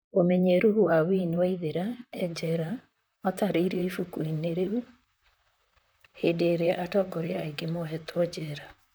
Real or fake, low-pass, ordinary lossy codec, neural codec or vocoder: fake; none; none; vocoder, 44.1 kHz, 128 mel bands, Pupu-Vocoder